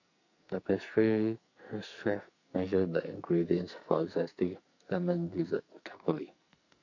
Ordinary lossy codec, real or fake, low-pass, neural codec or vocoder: none; fake; 7.2 kHz; codec, 44.1 kHz, 2.6 kbps, SNAC